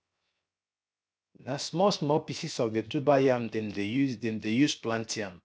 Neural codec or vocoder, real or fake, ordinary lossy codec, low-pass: codec, 16 kHz, 0.7 kbps, FocalCodec; fake; none; none